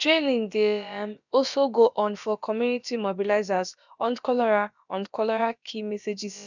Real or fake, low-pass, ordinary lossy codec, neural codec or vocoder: fake; 7.2 kHz; none; codec, 16 kHz, about 1 kbps, DyCAST, with the encoder's durations